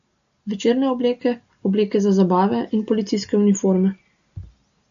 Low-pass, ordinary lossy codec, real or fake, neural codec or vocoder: 7.2 kHz; AAC, 96 kbps; real; none